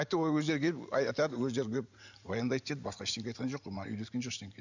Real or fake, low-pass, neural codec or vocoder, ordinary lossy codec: fake; 7.2 kHz; vocoder, 44.1 kHz, 128 mel bands every 512 samples, BigVGAN v2; none